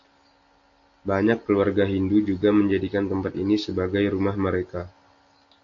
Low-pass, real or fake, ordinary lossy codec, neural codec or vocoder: 7.2 kHz; real; AAC, 48 kbps; none